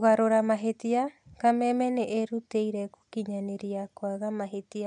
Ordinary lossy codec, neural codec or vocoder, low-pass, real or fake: none; none; 10.8 kHz; real